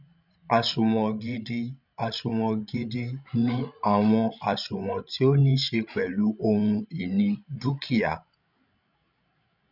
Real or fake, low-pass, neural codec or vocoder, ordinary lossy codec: fake; 5.4 kHz; codec, 16 kHz, 16 kbps, FreqCodec, larger model; none